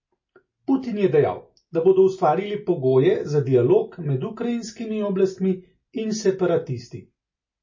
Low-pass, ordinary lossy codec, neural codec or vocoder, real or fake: 7.2 kHz; MP3, 32 kbps; none; real